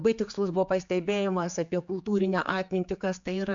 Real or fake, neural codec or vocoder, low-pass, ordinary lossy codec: fake; codec, 16 kHz, 4 kbps, X-Codec, HuBERT features, trained on general audio; 7.2 kHz; MP3, 64 kbps